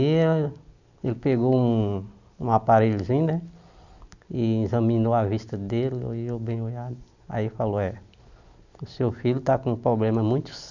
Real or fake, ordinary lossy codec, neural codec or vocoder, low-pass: real; none; none; 7.2 kHz